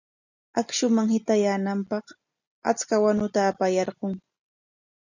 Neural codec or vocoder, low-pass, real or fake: none; 7.2 kHz; real